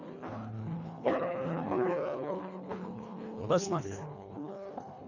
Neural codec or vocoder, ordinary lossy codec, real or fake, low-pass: codec, 24 kHz, 1.5 kbps, HILCodec; none; fake; 7.2 kHz